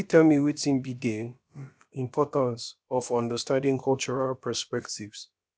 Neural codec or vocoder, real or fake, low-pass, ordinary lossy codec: codec, 16 kHz, about 1 kbps, DyCAST, with the encoder's durations; fake; none; none